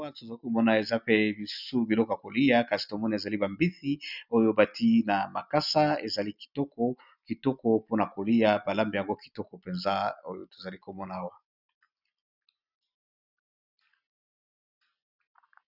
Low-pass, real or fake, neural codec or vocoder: 5.4 kHz; real; none